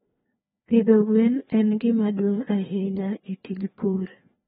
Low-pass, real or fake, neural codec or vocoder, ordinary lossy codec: 7.2 kHz; fake; codec, 16 kHz, 2 kbps, FreqCodec, larger model; AAC, 16 kbps